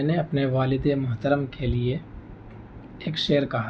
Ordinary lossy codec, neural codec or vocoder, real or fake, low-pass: none; none; real; none